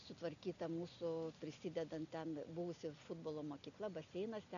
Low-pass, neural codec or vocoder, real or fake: 7.2 kHz; none; real